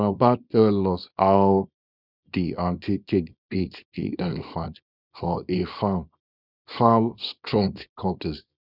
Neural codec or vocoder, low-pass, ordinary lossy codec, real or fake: codec, 24 kHz, 0.9 kbps, WavTokenizer, small release; 5.4 kHz; none; fake